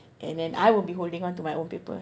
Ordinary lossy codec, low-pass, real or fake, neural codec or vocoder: none; none; real; none